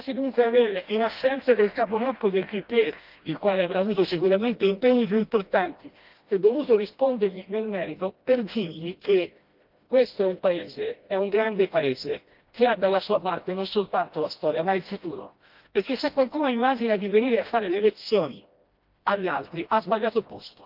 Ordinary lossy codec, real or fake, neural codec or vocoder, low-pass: Opus, 32 kbps; fake; codec, 16 kHz, 1 kbps, FreqCodec, smaller model; 5.4 kHz